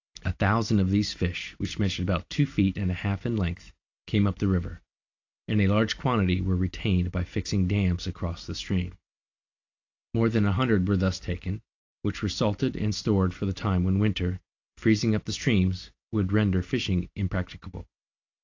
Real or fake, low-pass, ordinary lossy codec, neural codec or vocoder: real; 7.2 kHz; AAC, 48 kbps; none